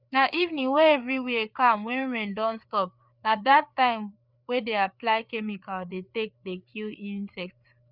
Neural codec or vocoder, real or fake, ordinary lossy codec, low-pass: codec, 16 kHz, 4 kbps, FreqCodec, larger model; fake; none; 5.4 kHz